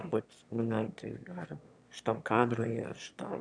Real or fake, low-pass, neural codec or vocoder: fake; 9.9 kHz; autoencoder, 22.05 kHz, a latent of 192 numbers a frame, VITS, trained on one speaker